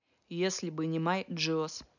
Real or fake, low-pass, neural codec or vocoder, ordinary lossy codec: real; 7.2 kHz; none; none